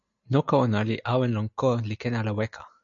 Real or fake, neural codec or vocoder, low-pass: real; none; 7.2 kHz